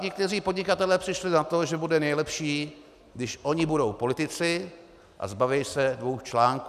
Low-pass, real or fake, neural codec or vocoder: 14.4 kHz; real; none